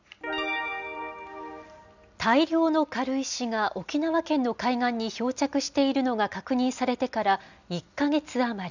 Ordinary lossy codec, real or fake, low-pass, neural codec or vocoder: none; real; 7.2 kHz; none